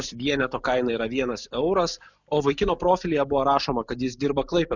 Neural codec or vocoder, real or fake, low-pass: none; real; 7.2 kHz